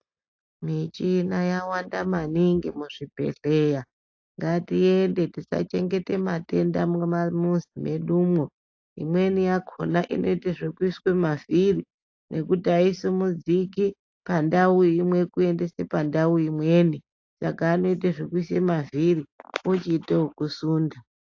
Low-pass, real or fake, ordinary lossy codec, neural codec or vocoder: 7.2 kHz; real; AAC, 48 kbps; none